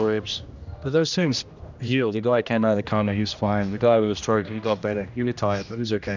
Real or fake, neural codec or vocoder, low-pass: fake; codec, 16 kHz, 1 kbps, X-Codec, HuBERT features, trained on general audio; 7.2 kHz